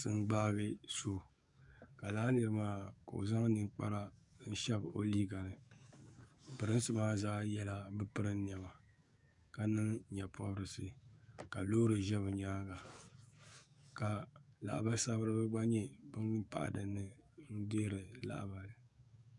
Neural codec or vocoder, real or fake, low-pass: codec, 44.1 kHz, 7.8 kbps, DAC; fake; 10.8 kHz